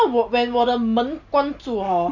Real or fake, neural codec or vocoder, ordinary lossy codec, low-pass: real; none; none; 7.2 kHz